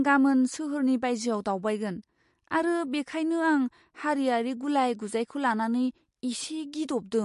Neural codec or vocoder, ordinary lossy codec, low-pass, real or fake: none; MP3, 48 kbps; 14.4 kHz; real